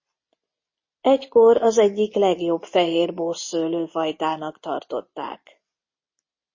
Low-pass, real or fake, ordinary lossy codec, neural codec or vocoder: 7.2 kHz; real; MP3, 32 kbps; none